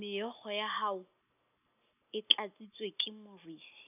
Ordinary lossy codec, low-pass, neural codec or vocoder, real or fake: none; 3.6 kHz; none; real